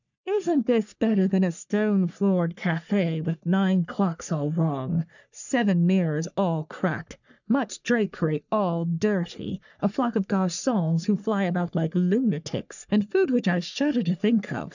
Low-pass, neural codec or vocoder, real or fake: 7.2 kHz; codec, 44.1 kHz, 3.4 kbps, Pupu-Codec; fake